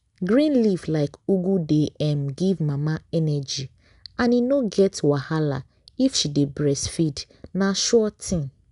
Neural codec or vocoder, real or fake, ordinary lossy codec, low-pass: none; real; none; 10.8 kHz